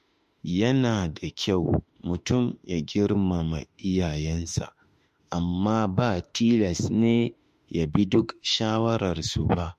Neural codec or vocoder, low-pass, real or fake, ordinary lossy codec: autoencoder, 48 kHz, 32 numbers a frame, DAC-VAE, trained on Japanese speech; 14.4 kHz; fake; MP3, 64 kbps